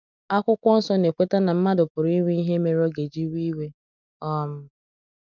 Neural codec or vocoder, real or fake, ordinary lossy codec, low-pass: none; real; none; 7.2 kHz